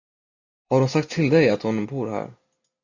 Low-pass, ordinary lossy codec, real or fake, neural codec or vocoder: 7.2 kHz; AAC, 48 kbps; real; none